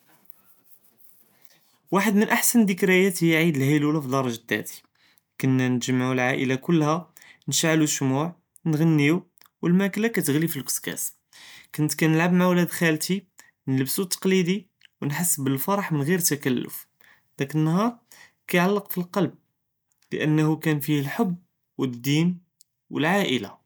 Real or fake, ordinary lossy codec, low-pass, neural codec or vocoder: real; none; none; none